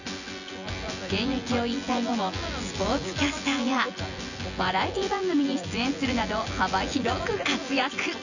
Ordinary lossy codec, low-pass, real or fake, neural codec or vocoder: none; 7.2 kHz; fake; vocoder, 24 kHz, 100 mel bands, Vocos